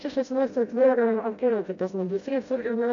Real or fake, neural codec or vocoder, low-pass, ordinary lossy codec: fake; codec, 16 kHz, 0.5 kbps, FreqCodec, smaller model; 7.2 kHz; AAC, 64 kbps